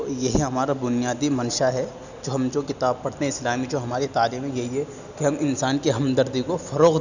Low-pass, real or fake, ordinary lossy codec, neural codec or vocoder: 7.2 kHz; real; none; none